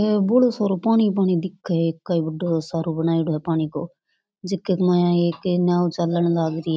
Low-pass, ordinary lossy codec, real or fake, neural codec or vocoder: none; none; real; none